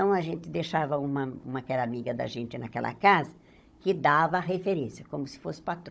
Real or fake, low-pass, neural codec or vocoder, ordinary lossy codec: fake; none; codec, 16 kHz, 16 kbps, FunCodec, trained on Chinese and English, 50 frames a second; none